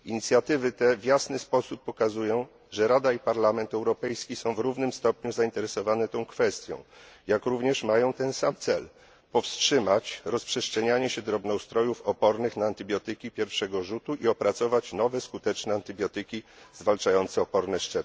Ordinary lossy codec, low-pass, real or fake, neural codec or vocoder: none; none; real; none